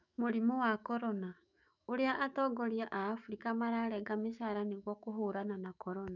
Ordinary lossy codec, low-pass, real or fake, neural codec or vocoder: none; 7.2 kHz; fake; autoencoder, 48 kHz, 128 numbers a frame, DAC-VAE, trained on Japanese speech